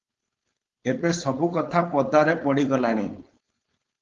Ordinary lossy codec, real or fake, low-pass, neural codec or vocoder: Opus, 32 kbps; fake; 7.2 kHz; codec, 16 kHz, 4.8 kbps, FACodec